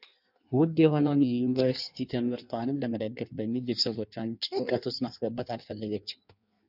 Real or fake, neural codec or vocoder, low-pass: fake; codec, 16 kHz in and 24 kHz out, 1.1 kbps, FireRedTTS-2 codec; 5.4 kHz